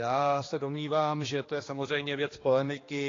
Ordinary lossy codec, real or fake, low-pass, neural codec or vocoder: AAC, 32 kbps; fake; 7.2 kHz; codec, 16 kHz, 2 kbps, X-Codec, HuBERT features, trained on general audio